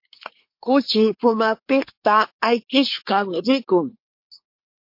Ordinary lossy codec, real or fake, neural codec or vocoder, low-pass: MP3, 32 kbps; fake; codec, 24 kHz, 1 kbps, SNAC; 5.4 kHz